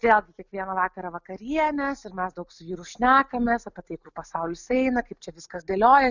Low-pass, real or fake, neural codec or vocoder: 7.2 kHz; real; none